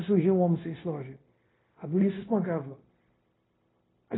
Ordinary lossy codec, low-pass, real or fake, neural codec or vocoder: AAC, 16 kbps; 7.2 kHz; fake; codec, 16 kHz, 0.9 kbps, LongCat-Audio-Codec